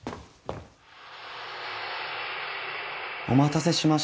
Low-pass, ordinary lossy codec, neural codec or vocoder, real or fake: none; none; none; real